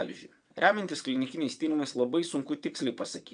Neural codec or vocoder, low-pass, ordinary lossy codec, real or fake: vocoder, 22.05 kHz, 80 mel bands, WaveNeXt; 9.9 kHz; MP3, 96 kbps; fake